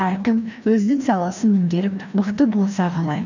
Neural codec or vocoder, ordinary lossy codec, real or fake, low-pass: codec, 16 kHz, 1 kbps, FreqCodec, larger model; none; fake; 7.2 kHz